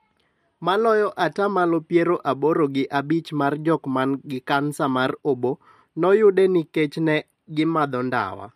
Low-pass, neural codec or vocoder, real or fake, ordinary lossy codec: 14.4 kHz; none; real; MP3, 64 kbps